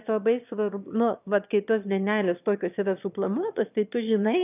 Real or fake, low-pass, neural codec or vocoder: fake; 3.6 kHz; autoencoder, 22.05 kHz, a latent of 192 numbers a frame, VITS, trained on one speaker